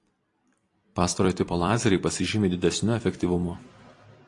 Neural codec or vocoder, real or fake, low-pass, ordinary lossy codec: vocoder, 44.1 kHz, 128 mel bands every 256 samples, BigVGAN v2; fake; 10.8 kHz; AAC, 48 kbps